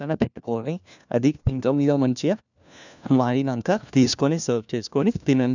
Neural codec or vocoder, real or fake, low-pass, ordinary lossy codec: codec, 16 kHz, 1 kbps, FunCodec, trained on LibriTTS, 50 frames a second; fake; 7.2 kHz; none